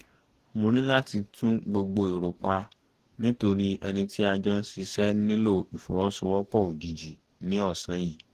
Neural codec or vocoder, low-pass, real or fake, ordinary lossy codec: codec, 44.1 kHz, 2.6 kbps, DAC; 14.4 kHz; fake; Opus, 16 kbps